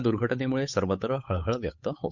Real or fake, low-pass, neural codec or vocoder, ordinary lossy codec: fake; 7.2 kHz; codec, 16 kHz, 8 kbps, FunCodec, trained on Chinese and English, 25 frames a second; Opus, 64 kbps